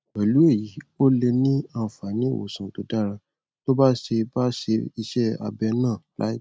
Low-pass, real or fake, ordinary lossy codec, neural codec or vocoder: none; real; none; none